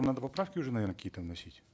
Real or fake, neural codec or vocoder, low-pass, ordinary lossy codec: real; none; none; none